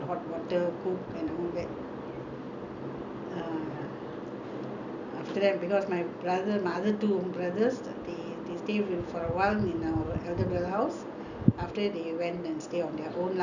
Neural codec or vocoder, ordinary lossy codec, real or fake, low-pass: none; none; real; 7.2 kHz